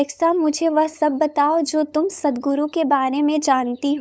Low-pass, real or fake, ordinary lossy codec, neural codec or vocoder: none; fake; none; codec, 16 kHz, 8 kbps, FunCodec, trained on LibriTTS, 25 frames a second